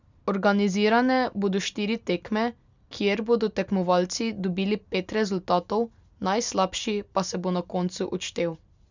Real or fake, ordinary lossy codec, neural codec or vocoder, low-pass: real; none; none; 7.2 kHz